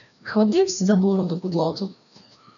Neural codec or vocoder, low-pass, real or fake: codec, 16 kHz, 1 kbps, FreqCodec, larger model; 7.2 kHz; fake